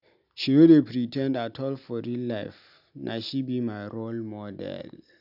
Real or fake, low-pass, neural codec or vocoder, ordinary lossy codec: real; 5.4 kHz; none; none